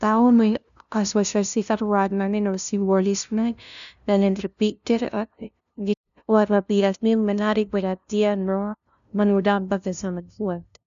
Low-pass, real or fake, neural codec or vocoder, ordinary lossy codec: 7.2 kHz; fake; codec, 16 kHz, 0.5 kbps, FunCodec, trained on LibriTTS, 25 frames a second; none